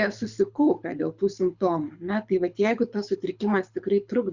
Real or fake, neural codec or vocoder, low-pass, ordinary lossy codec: fake; codec, 24 kHz, 6 kbps, HILCodec; 7.2 kHz; Opus, 64 kbps